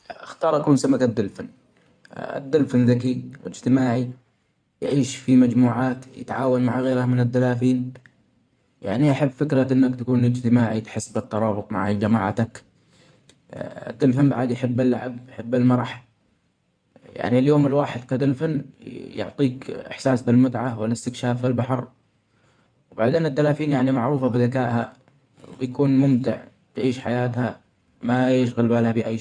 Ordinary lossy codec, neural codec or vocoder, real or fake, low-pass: none; codec, 16 kHz in and 24 kHz out, 2.2 kbps, FireRedTTS-2 codec; fake; 9.9 kHz